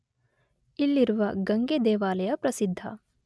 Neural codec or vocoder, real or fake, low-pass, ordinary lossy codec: none; real; none; none